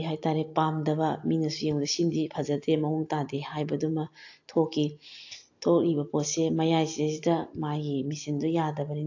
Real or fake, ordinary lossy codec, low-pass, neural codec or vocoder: real; AAC, 48 kbps; 7.2 kHz; none